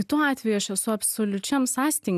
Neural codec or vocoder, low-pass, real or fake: none; 14.4 kHz; real